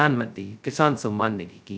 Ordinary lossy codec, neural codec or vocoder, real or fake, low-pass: none; codec, 16 kHz, 0.2 kbps, FocalCodec; fake; none